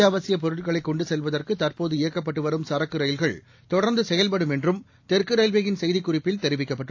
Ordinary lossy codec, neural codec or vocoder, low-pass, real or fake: AAC, 48 kbps; none; 7.2 kHz; real